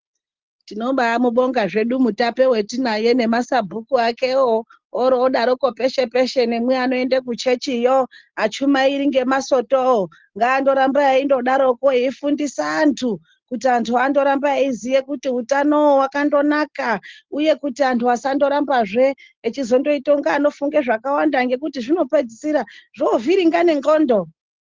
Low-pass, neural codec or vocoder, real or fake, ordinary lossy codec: 7.2 kHz; none; real; Opus, 16 kbps